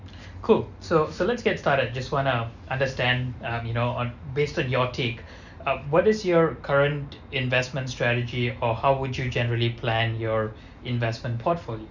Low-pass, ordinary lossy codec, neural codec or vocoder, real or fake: 7.2 kHz; none; none; real